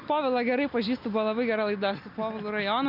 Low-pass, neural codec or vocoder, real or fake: 5.4 kHz; none; real